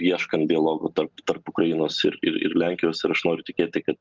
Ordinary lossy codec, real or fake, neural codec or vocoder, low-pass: Opus, 32 kbps; real; none; 7.2 kHz